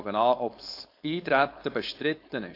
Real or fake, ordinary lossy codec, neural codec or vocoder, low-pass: fake; AAC, 32 kbps; codec, 16 kHz, 4.8 kbps, FACodec; 5.4 kHz